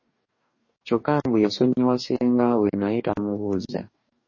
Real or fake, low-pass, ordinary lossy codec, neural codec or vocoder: fake; 7.2 kHz; MP3, 32 kbps; codec, 44.1 kHz, 2.6 kbps, DAC